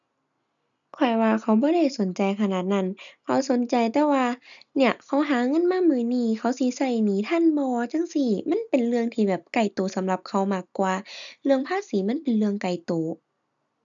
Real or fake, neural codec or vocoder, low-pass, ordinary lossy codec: real; none; 7.2 kHz; none